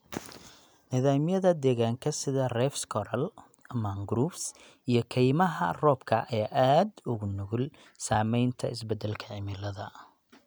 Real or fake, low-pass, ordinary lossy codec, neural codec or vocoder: real; none; none; none